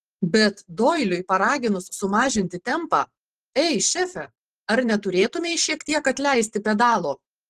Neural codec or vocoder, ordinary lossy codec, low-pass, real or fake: vocoder, 44.1 kHz, 128 mel bands every 512 samples, BigVGAN v2; Opus, 16 kbps; 14.4 kHz; fake